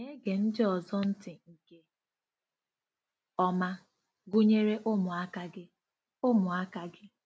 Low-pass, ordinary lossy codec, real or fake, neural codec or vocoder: none; none; real; none